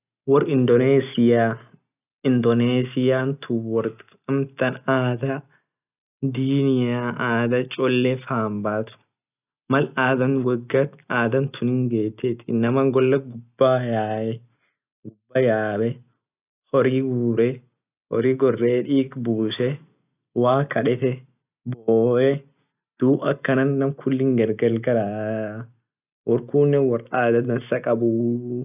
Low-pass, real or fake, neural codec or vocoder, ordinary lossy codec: 3.6 kHz; real; none; none